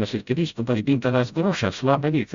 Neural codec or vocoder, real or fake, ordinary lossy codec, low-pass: codec, 16 kHz, 0.5 kbps, FreqCodec, smaller model; fake; Opus, 64 kbps; 7.2 kHz